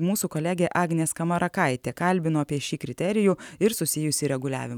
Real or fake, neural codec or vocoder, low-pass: real; none; 19.8 kHz